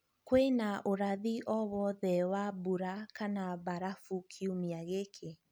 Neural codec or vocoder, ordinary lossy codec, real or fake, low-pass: none; none; real; none